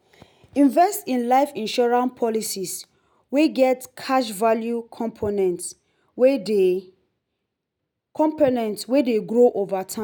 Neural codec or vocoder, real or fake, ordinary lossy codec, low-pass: none; real; none; none